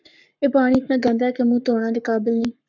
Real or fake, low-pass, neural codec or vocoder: fake; 7.2 kHz; codec, 44.1 kHz, 7.8 kbps, Pupu-Codec